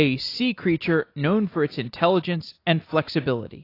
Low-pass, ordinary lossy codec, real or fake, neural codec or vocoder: 5.4 kHz; AAC, 32 kbps; real; none